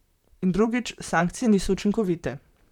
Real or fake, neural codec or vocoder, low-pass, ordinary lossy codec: fake; vocoder, 44.1 kHz, 128 mel bands, Pupu-Vocoder; 19.8 kHz; none